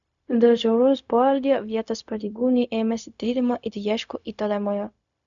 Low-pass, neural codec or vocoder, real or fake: 7.2 kHz; codec, 16 kHz, 0.4 kbps, LongCat-Audio-Codec; fake